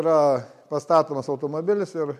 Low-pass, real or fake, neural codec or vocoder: 14.4 kHz; real; none